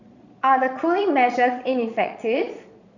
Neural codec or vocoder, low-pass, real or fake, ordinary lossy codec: vocoder, 22.05 kHz, 80 mel bands, Vocos; 7.2 kHz; fake; none